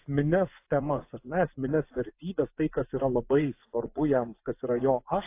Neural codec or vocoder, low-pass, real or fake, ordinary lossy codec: vocoder, 24 kHz, 100 mel bands, Vocos; 3.6 kHz; fake; AAC, 24 kbps